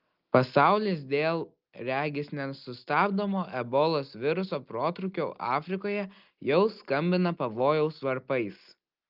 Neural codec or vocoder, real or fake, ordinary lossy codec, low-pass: none; real; Opus, 24 kbps; 5.4 kHz